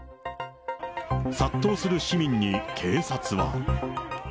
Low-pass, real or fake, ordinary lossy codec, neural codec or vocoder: none; real; none; none